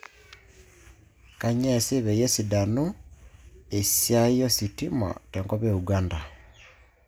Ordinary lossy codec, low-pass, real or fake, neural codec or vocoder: none; none; real; none